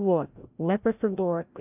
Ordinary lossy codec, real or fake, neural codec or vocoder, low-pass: AAC, 32 kbps; fake; codec, 16 kHz, 0.5 kbps, FreqCodec, larger model; 3.6 kHz